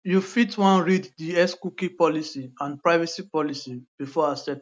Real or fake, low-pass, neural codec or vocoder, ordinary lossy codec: real; none; none; none